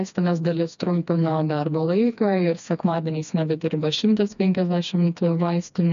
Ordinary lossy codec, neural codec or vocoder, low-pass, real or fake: MP3, 96 kbps; codec, 16 kHz, 2 kbps, FreqCodec, smaller model; 7.2 kHz; fake